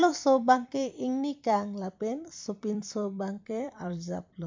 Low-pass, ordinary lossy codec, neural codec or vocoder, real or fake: 7.2 kHz; MP3, 64 kbps; vocoder, 44.1 kHz, 128 mel bands every 256 samples, BigVGAN v2; fake